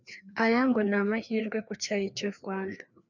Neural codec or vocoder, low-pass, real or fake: codec, 16 kHz, 2 kbps, FreqCodec, larger model; 7.2 kHz; fake